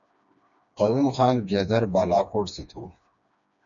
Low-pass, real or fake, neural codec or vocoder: 7.2 kHz; fake; codec, 16 kHz, 2 kbps, FreqCodec, smaller model